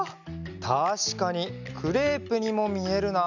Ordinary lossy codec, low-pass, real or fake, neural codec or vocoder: none; 7.2 kHz; real; none